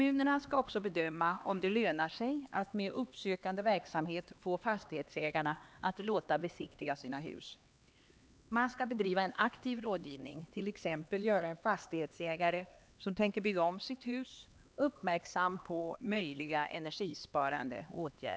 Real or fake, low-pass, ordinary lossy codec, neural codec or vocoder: fake; none; none; codec, 16 kHz, 2 kbps, X-Codec, HuBERT features, trained on LibriSpeech